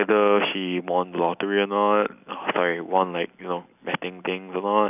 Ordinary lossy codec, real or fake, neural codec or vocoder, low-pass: none; real; none; 3.6 kHz